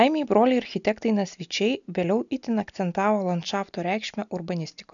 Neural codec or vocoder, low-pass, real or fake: none; 7.2 kHz; real